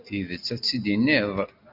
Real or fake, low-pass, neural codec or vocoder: real; 5.4 kHz; none